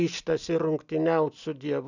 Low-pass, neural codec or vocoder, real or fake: 7.2 kHz; none; real